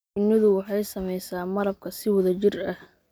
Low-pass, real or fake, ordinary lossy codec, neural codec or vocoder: none; real; none; none